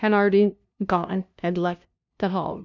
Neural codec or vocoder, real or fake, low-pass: codec, 16 kHz, 0.5 kbps, FunCodec, trained on LibriTTS, 25 frames a second; fake; 7.2 kHz